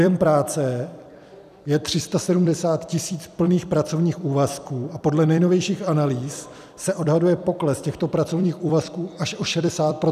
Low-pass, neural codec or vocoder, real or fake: 14.4 kHz; vocoder, 44.1 kHz, 128 mel bands every 256 samples, BigVGAN v2; fake